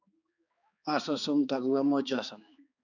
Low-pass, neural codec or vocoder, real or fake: 7.2 kHz; codec, 16 kHz, 2 kbps, X-Codec, HuBERT features, trained on balanced general audio; fake